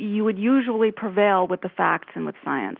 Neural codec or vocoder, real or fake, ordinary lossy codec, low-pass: none; real; Opus, 32 kbps; 5.4 kHz